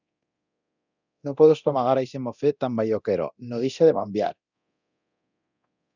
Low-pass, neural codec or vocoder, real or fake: 7.2 kHz; codec, 24 kHz, 0.9 kbps, DualCodec; fake